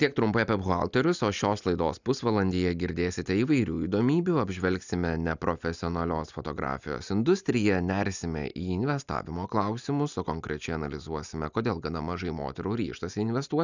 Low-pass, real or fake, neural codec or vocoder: 7.2 kHz; real; none